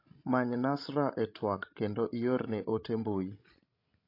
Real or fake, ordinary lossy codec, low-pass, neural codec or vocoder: fake; AAC, 32 kbps; 5.4 kHz; codec, 16 kHz, 16 kbps, FreqCodec, larger model